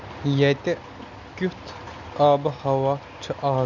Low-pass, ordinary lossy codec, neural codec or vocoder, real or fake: 7.2 kHz; none; none; real